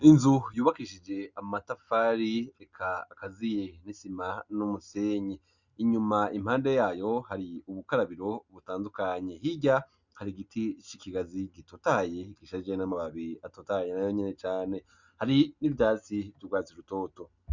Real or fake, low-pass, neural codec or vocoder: real; 7.2 kHz; none